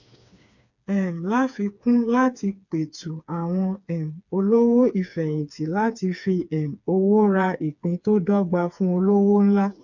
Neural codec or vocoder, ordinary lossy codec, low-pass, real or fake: codec, 16 kHz, 4 kbps, FreqCodec, smaller model; none; 7.2 kHz; fake